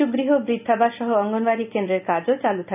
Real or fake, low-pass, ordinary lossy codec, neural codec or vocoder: real; 3.6 kHz; none; none